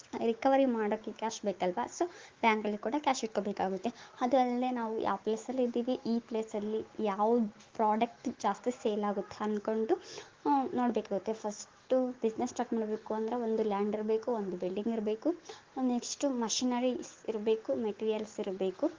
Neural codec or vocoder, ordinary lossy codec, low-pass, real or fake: autoencoder, 48 kHz, 128 numbers a frame, DAC-VAE, trained on Japanese speech; Opus, 16 kbps; 7.2 kHz; fake